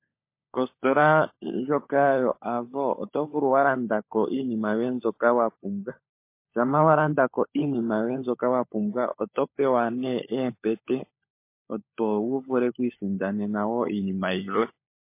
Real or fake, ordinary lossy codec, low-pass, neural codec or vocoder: fake; MP3, 24 kbps; 3.6 kHz; codec, 16 kHz, 16 kbps, FunCodec, trained on LibriTTS, 50 frames a second